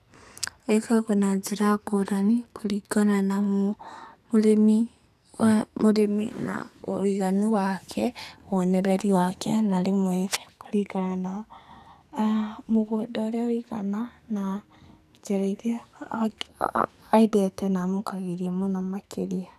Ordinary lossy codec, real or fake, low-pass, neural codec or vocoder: none; fake; 14.4 kHz; codec, 44.1 kHz, 2.6 kbps, SNAC